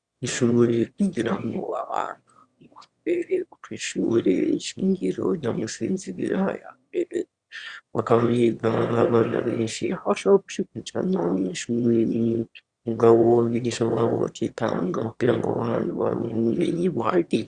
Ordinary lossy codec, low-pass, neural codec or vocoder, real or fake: Opus, 64 kbps; 9.9 kHz; autoencoder, 22.05 kHz, a latent of 192 numbers a frame, VITS, trained on one speaker; fake